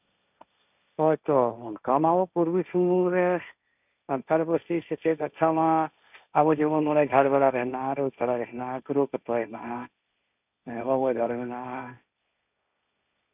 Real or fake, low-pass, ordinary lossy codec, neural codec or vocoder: fake; 3.6 kHz; none; codec, 16 kHz, 1.1 kbps, Voila-Tokenizer